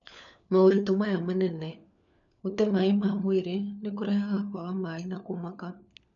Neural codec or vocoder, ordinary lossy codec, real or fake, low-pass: codec, 16 kHz, 4 kbps, FunCodec, trained on LibriTTS, 50 frames a second; none; fake; 7.2 kHz